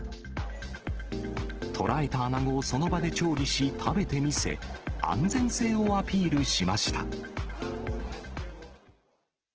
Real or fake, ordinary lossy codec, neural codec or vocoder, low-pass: real; Opus, 16 kbps; none; 7.2 kHz